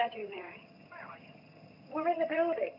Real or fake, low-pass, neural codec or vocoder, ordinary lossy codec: fake; 5.4 kHz; vocoder, 22.05 kHz, 80 mel bands, HiFi-GAN; AAC, 32 kbps